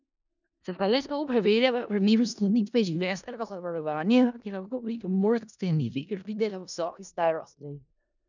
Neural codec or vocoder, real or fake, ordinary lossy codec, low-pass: codec, 16 kHz in and 24 kHz out, 0.4 kbps, LongCat-Audio-Codec, four codebook decoder; fake; none; 7.2 kHz